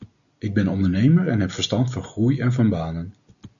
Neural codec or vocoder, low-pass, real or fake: none; 7.2 kHz; real